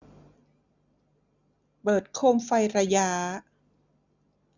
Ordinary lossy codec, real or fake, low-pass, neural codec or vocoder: none; real; 7.2 kHz; none